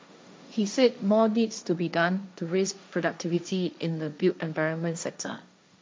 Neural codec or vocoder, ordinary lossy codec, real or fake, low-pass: codec, 16 kHz, 1.1 kbps, Voila-Tokenizer; none; fake; none